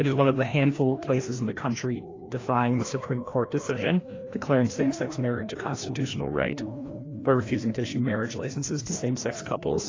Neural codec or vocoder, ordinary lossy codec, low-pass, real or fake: codec, 16 kHz, 1 kbps, FreqCodec, larger model; AAC, 32 kbps; 7.2 kHz; fake